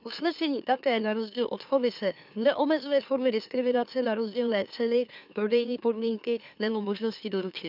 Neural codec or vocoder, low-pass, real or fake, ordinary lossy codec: autoencoder, 44.1 kHz, a latent of 192 numbers a frame, MeloTTS; 5.4 kHz; fake; none